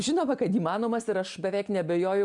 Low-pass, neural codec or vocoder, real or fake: 10.8 kHz; none; real